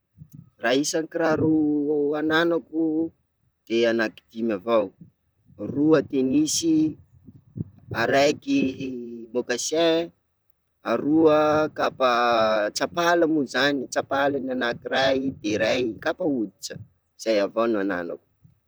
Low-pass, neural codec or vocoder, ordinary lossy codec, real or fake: none; vocoder, 44.1 kHz, 128 mel bands, Pupu-Vocoder; none; fake